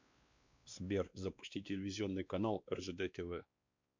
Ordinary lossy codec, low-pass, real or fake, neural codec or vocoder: MP3, 64 kbps; 7.2 kHz; fake; codec, 16 kHz, 2 kbps, X-Codec, WavLM features, trained on Multilingual LibriSpeech